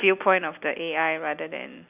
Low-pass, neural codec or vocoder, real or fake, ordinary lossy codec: 3.6 kHz; none; real; none